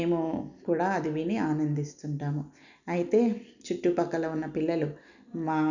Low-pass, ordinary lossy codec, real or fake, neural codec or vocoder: 7.2 kHz; none; real; none